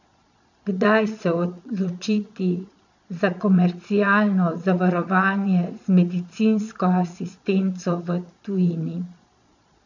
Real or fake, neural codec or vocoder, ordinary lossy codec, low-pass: fake; vocoder, 22.05 kHz, 80 mel bands, Vocos; none; 7.2 kHz